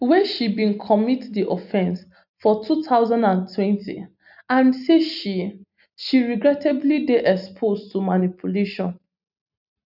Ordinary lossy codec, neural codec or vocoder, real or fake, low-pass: none; none; real; 5.4 kHz